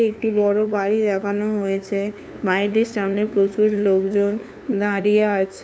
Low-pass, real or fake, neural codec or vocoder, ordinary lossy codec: none; fake; codec, 16 kHz, 2 kbps, FunCodec, trained on LibriTTS, 25 frames a second; none